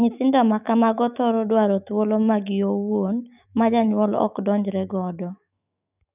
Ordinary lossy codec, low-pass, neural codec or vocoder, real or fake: none; 3.6 kHz; autoencoder, 48 kHz, 128 numbers a frame, DAC-VAE, trained on Japanese speech; fake